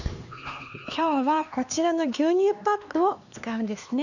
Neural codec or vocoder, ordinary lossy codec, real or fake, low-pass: codec, 16 kHz, 2 kbps, X-Codec, HuBERT features, trained on LibriSpeech; none; fake; 7.2 kHz